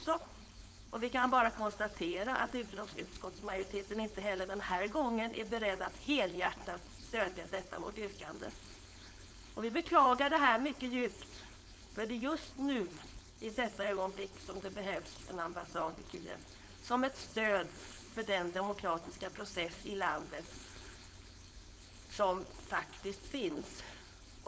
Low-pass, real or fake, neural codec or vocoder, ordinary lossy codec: none; fake; codec, 16 kHz, 4.8 kbps, FACodec; none